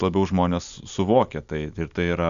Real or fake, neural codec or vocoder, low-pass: real; none; 7.2 kHz